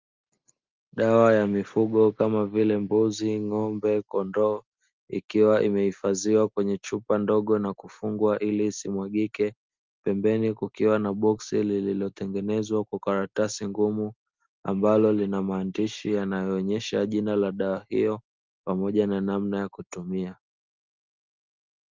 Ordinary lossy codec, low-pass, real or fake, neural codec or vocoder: Opus, 32 kbps; 7.2 kHz; real; none